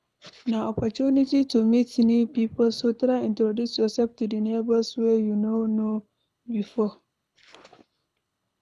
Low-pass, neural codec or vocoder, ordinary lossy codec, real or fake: none; codec, 24 kHz, 6 kbps, HILCodec; none; fake